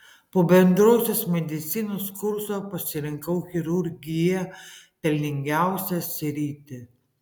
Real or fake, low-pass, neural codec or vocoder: real; 19.8 kHz; none